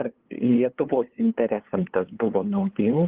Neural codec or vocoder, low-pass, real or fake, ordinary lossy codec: codec, 16 kHz, 2 kbps, FunCodec, trained on LibriTTS, 25 frames a second; 3.6 kHz; fake; Opus, 16 kbps